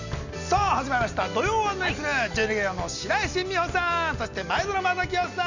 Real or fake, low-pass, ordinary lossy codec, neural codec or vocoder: real; 7.2 kHz; none; none